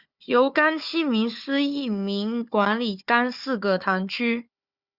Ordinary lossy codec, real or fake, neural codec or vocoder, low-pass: Opus, 64 kbps; fake; codec, 16 kHz, 4 kbps, FunCodec, trained on Chinese and English, 50 frames a second; 5.4 kHz